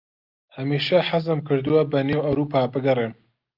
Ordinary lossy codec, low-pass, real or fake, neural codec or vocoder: Opus, 24 kbps; 5.4 kHz; real; none